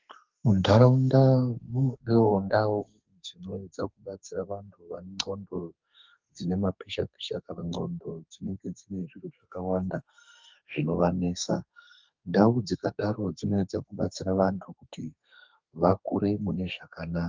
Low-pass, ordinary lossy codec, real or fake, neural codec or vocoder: 7.2 kHz; Opus, 24 kbps; fake; codec, 32 kHz, 1.9 kbps, SNAC